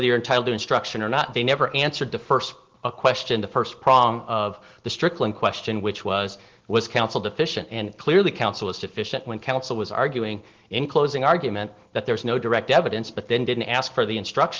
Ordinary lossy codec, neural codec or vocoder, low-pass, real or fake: Opus, 16 kbps; none; 7.2 kHz; real